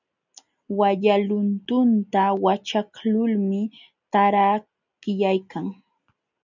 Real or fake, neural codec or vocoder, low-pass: real; none; 7.2 kHz